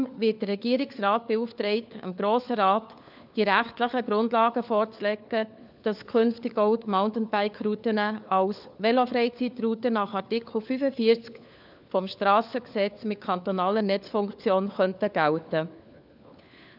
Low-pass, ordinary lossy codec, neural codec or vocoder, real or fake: 5.4 kHz; none; codec, 16 kHz, 4 kbps, FunCodec, trained on LibriTTS, 50 frames a second; fake